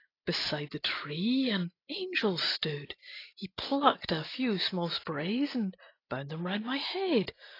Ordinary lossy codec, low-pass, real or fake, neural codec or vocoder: AAC, 24 kbps; 5.4 kHz; real; none